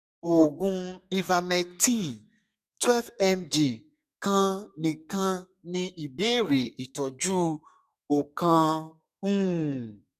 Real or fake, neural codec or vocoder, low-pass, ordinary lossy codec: fake; codec, 32 kHz, 1.9 kbps, SNAC; 14.4 kHz; none